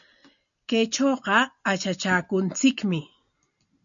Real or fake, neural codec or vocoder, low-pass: real; none; 7.2 kHz